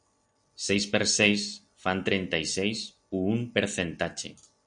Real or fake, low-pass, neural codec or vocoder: real; 9.9 kHz; none